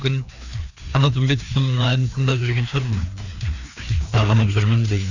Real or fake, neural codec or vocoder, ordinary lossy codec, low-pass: fake; codec, 16 kHz, 2 kbps, FreqCodec, larger model; none; 7.2 kHz